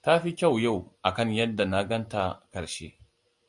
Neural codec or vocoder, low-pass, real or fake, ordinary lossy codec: vocoder, 44.1 kHz, 128 mel bands every 256 samples, BigVGAN v2; 10.8 kHz; fake; MP3, 64 kbps